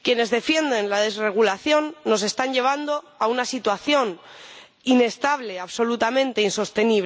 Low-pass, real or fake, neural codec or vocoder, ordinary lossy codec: none; real; none; none